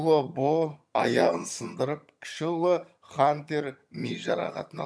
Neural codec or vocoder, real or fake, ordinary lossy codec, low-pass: vocoder, 22.05 kHz, 80 mel bands, HiFi-GAN; fake; none; none